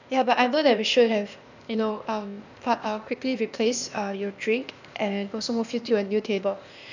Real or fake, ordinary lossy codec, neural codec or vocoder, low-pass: fake; none; codec, 16 kHz, 0.8 kbps, ZipCodec; 7.2 kHz